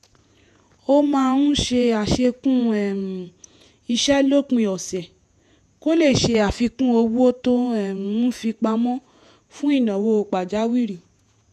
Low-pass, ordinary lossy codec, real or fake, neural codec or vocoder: 14.4 kHz; none; fake; vocoder, 48 kHz, 128 mel bands, Vocos